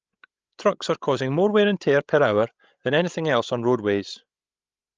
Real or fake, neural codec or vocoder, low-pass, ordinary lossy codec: fake; codec, 16 kHz, 16 kbps, FreqCodec, larger model; 7.2 kHz; Opus, 24 kbps